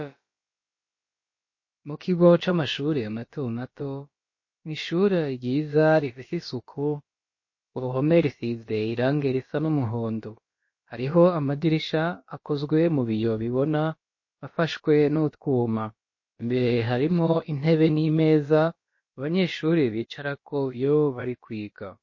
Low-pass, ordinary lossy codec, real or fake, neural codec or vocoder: 7.2 kHz; MP3, 32 kbps; fake; codec, 16 kHz, about 1 kbps, DyCAST, with the encoder's durations